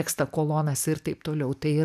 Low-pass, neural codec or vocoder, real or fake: 14.4 kHz; none; real